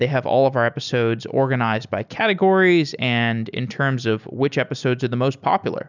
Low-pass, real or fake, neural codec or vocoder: 7.2 kHz; real; none